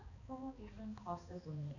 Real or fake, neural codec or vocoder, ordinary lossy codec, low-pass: fake; codec, 16 kHz, 2 kbps, X-Codec, HuBERT features, trained on balanced general audio; none; 7.2 kHz